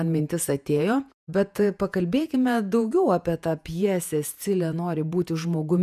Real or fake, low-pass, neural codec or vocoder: fake; 14.4 kHz; vocoder, 48 kHz, 128 mel bands, Vocos